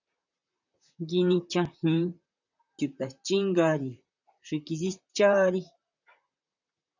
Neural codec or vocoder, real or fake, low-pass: vocoder, 44.1 kHz, 128 mel bands, Pupu-Vocoder; fake; 7.2 kHz